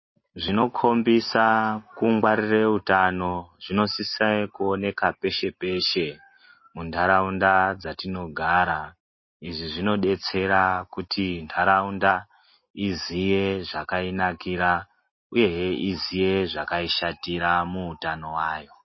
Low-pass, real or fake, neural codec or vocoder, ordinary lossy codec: 7.2 kHz; real; none; MP3, 24 kbps